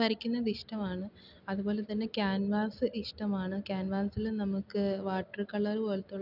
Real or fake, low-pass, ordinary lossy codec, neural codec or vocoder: real; 5.4 kHz; none; none